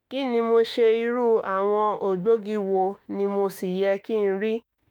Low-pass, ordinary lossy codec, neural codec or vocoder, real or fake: 19.8 kHz; none; autoencoder, 48 kHz, 32 numbers a frame, DAC-VAE, trained on Japanese speech; fake